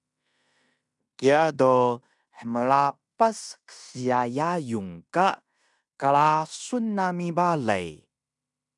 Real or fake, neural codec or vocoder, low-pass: fake; codec, 16 kHz in and 24 kHz out, 0.9 kbps, LongCat-Audio-Codec, fine tuned four codebook decoder; 10.8 kHz